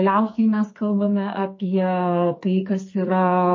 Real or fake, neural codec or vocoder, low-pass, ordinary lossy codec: fake; codec, 44.1 kHz, 2.6 kbps, SNAC; 7.2 kHz; MP3, 32 kbps